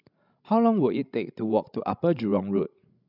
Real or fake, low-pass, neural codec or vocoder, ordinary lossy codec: fake; 5.4 kHz; codec, 16 kHz, 16 kbps, FreqCodec, larger model; none